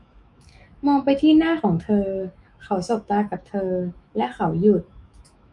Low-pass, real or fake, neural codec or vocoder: 10.8 kHz; fake; autoencoder, 48 kHz, 128 numbers a frame, DAC-VAE, trained on Japanese speech